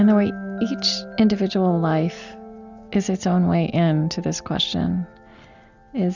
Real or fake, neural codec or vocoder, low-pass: real; none; 7.2 kHz